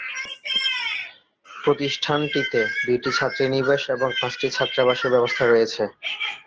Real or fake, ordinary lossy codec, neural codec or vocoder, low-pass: real; Opus, 16 kbps; none; 7.2 kHz